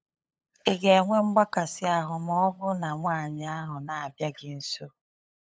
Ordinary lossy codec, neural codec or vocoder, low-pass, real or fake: none; codec, 16 kHz, 8 kbps, FunCodec, trained on LibriTTS, 25 frames a second; none; fake